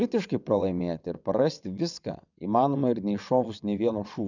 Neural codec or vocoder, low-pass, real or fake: vocoder, 22.05 kHz, 80 mel bands, WaveNeXt; 7.2 kHz; fake